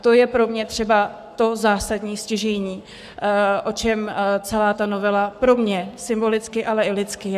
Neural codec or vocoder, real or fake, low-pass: codec, 44.1 kHz, 7.8 kbps, Pupu-Codec; fake; 14.4 kHz